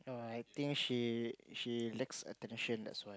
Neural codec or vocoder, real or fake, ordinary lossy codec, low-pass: none; real; none; none